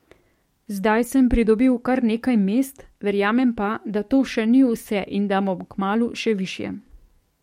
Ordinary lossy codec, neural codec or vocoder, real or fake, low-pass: MP3, 64 kbps; codec, 44.1 kHz, 7.8 kbps, DAC; fake; 19.8 kHz